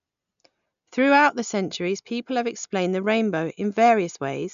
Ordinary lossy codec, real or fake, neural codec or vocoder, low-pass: none; real; none; 7.2 kHz